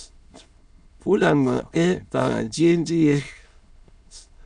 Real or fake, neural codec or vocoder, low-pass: fake; autoencoder, 22.05 kHz, a latent of 192 numbers a frame, VITS, trained on many speakers; 9.9 kHz